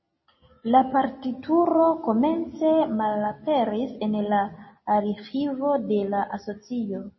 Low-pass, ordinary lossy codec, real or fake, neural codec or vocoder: 7.2 kHz; MP3, 24 kbps; real; none